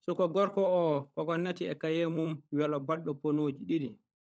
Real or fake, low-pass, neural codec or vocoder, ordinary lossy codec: fake; none; codec, 16 kHz, 16 kbps, FunCodec, trained on LibriTTS, 50 frames a second; none